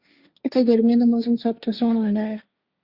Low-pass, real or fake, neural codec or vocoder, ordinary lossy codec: 5.4 kHz; fake; codec, 16 kHz, 1.1 kbps, Voila-Tokenizer; Opus, 64 kbps